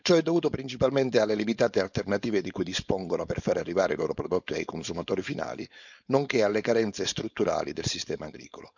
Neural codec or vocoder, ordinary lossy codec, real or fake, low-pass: codec, 16 kHz, 4.8 kbps, FACodec; none; fake; 7.2 kHz